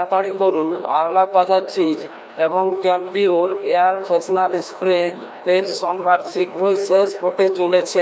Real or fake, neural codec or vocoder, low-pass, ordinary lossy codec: fake; codec, 16 kHz, 1 kbps, FreqCodec, larger model; none; none